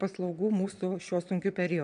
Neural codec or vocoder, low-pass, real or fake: vocoder, 22.05 kHz, 80 mel bands, Vocos; 9.9 kHz; fake